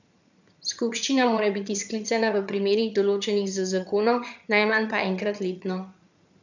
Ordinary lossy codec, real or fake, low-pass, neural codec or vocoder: none; fake; 7.2 kHz; vocoder, 22.05 kHz, 80 mel bands, HiFi-GAN